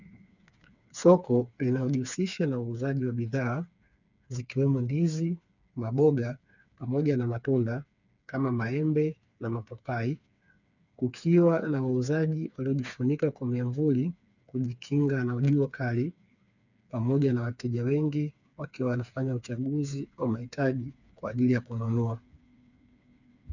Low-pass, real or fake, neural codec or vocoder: 7.2 kHz; fake; codec, 16 kHz, 4 kbps, FreqCodec, smaller model